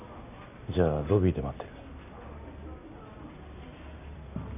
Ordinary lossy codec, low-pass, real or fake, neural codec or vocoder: none; 3.6 kHz; real; none